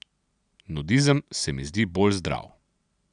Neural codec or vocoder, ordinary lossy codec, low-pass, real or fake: none; none; 9.9 kHz; real